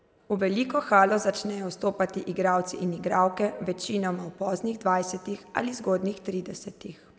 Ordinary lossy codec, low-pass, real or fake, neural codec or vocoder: none; none; real; none